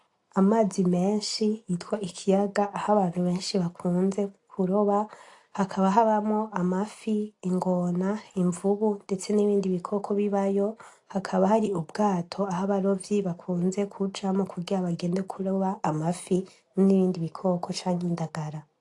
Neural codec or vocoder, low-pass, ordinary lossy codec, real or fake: none; 10.8 kHz; AAC, 48 kbps; real